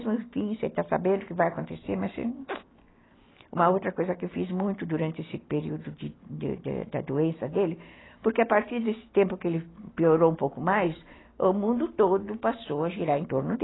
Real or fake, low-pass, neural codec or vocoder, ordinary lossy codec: real; 7.2 kHz; none; AAC, 16 kbps